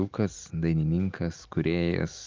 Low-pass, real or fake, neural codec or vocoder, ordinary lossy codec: 7.2 kHz; real; none; Opus, 24 kbps